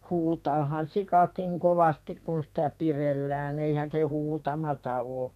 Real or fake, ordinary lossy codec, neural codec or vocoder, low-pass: fake; none; codec, 32 kHz, 1.9 kbps, SNAC; 14.4 kHz